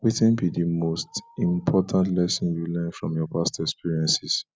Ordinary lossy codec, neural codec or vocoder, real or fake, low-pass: none; none; real; none